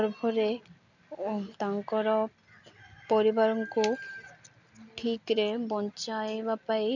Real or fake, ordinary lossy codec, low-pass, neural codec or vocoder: real; none; 7.2 kHz; none